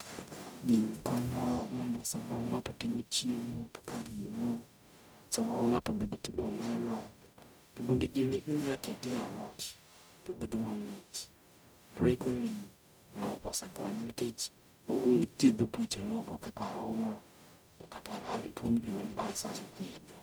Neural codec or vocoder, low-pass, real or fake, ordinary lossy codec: codec, 44.1 kHz, 0.9 kbps, DAC; none; fake; none